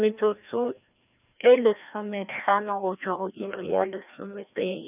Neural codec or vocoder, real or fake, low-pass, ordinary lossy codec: codec, 16 kHz, 1 kbps, FreqCodec, larger model; fake; 3.6 kHz; none